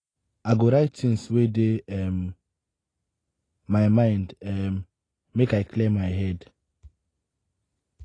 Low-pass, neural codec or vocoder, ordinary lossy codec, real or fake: 9.9 kHz; none; AAC, 32 kbps; real